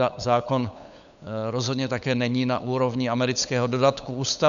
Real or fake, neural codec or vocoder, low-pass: fake; codec, 16 kHz, 8 kbps, FunCodec, trained on LibriTTS, 25 frames a second; 7.2 kHz